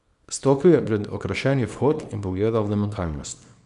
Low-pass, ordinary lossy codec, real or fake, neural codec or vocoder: 10.8 kHz; none; fake; codec, 24 kHz, 0.9 kbps, WavTokenizer, small release